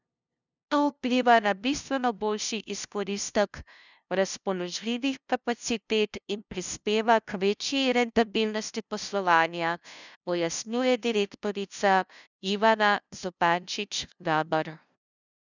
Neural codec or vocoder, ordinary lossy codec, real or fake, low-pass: codec, 16 kHz, 0.5 kbps, FunCodec, trained on LibriTTS, 25 frames a second; none; fake; 7.2 kHz